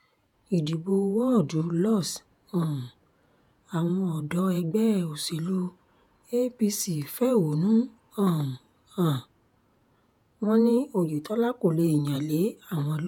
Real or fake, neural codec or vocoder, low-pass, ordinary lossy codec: fake; vocoder, 48 kHz, 128 mel bands, Vocos; 19.8 kHz; none